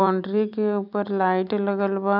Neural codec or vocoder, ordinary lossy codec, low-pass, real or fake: vocoder, 44.1 kHz, 128 mel bands every 256 samples, BigVGAN v2; none; 5.4 kHz; fake